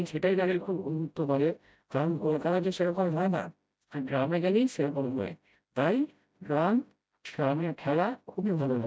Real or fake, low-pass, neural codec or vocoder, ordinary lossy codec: fake; none; codec, 16 kHz, 0.5 kbps, FreqCodec, smaller model; none